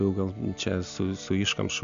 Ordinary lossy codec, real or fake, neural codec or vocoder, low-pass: MP3, 64 kbps; real; none; 7.2 kHz